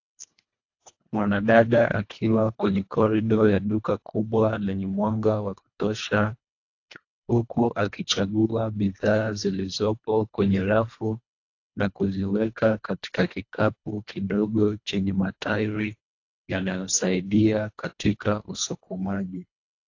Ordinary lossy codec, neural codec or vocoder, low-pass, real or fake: AAC, 48 kbps; codec, 24 kHz, 1.5 kbps, HILCodec; 7.2 kHz; fake